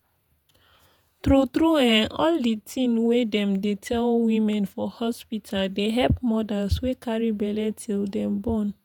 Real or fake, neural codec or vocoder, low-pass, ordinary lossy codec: fake; vocoder, 48 kHz, 128 mel bands, Vocos; 19.8 kHz; none